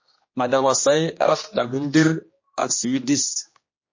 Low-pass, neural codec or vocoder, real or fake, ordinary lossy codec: 7.2 kHz; codec, 16 kHz, 1 kbps, X-Codec, HuBERT features, trained on general audio; fake; MP3, 32 kbps